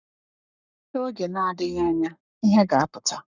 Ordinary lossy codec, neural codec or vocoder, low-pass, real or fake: none; codec, 44.1 kHz, 7.8 kbps, Pupu-Codec; 7.2 kHz; fake